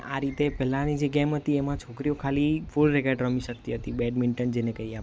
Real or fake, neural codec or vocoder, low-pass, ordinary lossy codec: real; none; none; none